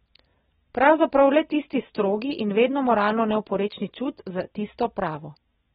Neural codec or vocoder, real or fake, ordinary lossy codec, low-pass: none; real; AAC, 16 kbps; 7.2 kHz